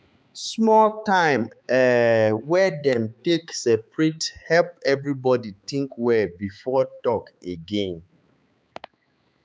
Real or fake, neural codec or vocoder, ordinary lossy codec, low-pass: fake; codec, 16 kHz, 4 kbps, X-Codec, HuBERT features, trained on balanced general audio; none; none